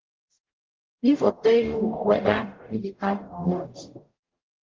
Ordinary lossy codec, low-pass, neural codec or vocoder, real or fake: Opus, 24 kbps; 7.2 kHz; codec, 44.1 kHz, 0.9 kbps, DAC; fake